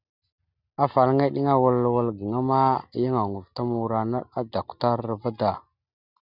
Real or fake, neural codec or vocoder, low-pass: real; none; 5.4 kHz